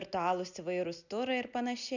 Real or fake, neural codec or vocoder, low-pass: real; none; 7.2 kHz